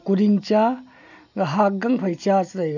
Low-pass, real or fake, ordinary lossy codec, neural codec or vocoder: 7.2 kHz; real; none; none